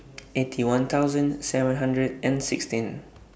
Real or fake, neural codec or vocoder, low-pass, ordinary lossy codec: real; none; none; none